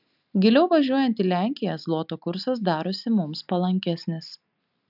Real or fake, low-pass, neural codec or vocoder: real; 5.4 kHz; none